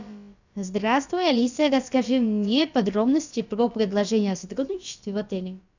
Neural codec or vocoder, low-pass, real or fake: codec, 16 kHz, about 1 kbps, DyCAST, with the encoder's durations; 7.2 kHz; fake